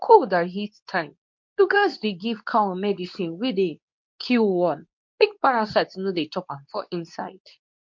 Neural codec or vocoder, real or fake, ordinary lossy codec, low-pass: codec, 24 kHz, 0.9 kbps, WavTokenizer, medium speech release version 2; fake; MP3, 48 kbps; 7.2 kHz